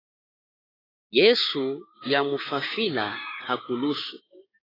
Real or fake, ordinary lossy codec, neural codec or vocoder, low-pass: fake; AAC, 24 kbps; codec, 16 kHz, 6 kbps, DAC; 5.4 kHz